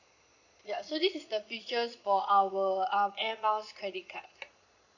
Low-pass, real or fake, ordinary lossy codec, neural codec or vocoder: 7.2 kHz; fake; none; vocoder, 22.05 kHz, 80 mel bands, Vocos